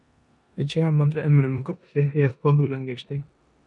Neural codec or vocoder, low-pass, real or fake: codec, 16 kHz in and 24 kHz out, 0.9 kbps, LongCat-Audio-Codec, four codebook decoder; 10.8 kHz; fake